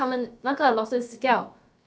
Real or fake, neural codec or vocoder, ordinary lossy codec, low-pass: fake; codec, 16 kHz, about 1 kbps, DyCAST, with the encoder's durations; none; none